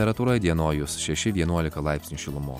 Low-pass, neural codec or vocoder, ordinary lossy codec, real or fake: 19.8 kHz; none; MP3, 96 kbps; real